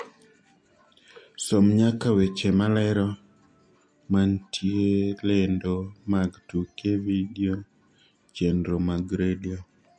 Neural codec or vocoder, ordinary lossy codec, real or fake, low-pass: none; MP3, 48 kbps; real; 9.9 kHz